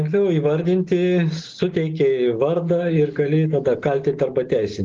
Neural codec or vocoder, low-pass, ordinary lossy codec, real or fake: none; 7.2 kHz; Opus, 16 kbps; real